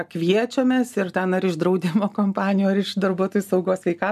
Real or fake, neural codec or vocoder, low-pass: real; none; 14.4 kHz